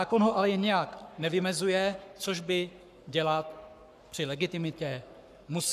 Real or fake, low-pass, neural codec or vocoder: fake; 14.4 kHz; codec, 44.1 kHz, 7.8 kbps, Pupu-Codec